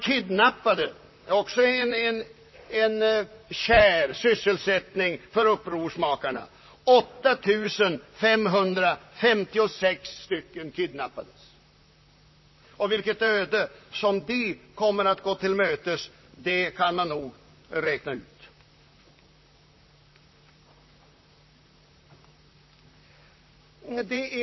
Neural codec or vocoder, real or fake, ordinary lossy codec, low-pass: vocoder, 44.1 kHz, 128 mel bands, Pupu-Vocoder; fake; MP3, 24 kbps; 7.2 kHz